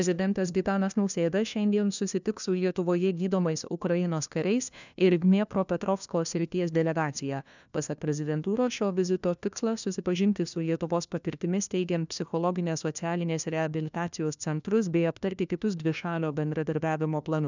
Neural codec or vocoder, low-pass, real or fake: codec, 16 kHz, 1 kbps, FunCodec, trained on LibriTTS, 50 frames a second; 7.2 kHz; fake